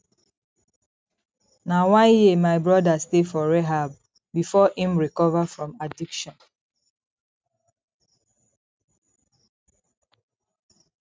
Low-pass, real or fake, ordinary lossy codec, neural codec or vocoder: none; real; none; none